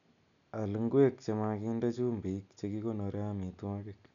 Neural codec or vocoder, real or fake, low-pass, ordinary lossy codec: none; real; 7.2 kHz; none